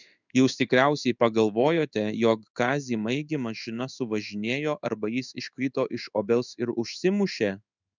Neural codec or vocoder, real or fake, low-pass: codec, 16 kHz in and 24 kHz out, 1 kbps, XY-Tokenizer; fake; 7.2 kHz